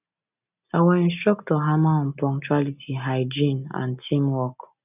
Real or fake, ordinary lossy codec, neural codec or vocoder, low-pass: real; none; none; 3.6 kHz